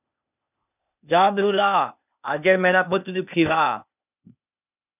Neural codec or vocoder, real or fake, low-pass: codec, 16 kHz, 0.8 kbps, ZipCodec; fake; 3.6 kHz